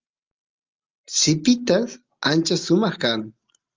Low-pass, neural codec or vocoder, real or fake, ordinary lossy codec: 7.2 kHz; none; real; Opus, 32 kbps